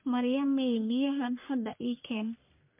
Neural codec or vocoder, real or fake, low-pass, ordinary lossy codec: codec, 44.1 kHz, 1.7 kbps, Pupu-Codec; fake; 3.6 kHz; MP3, 32 kbps